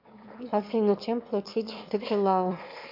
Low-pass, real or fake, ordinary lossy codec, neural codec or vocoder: 5.4 kHz; fake; MP3, 48 kbps; autoencoder, 22.05 kHz, a latent of 192 numbers a frame, VITS, trained on one speaker